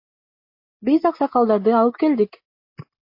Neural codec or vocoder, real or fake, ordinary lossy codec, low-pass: none; real; MP3, 32 kbps; 5.4 kHz